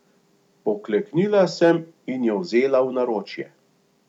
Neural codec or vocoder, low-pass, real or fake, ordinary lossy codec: none; 19.8 kHz; real; none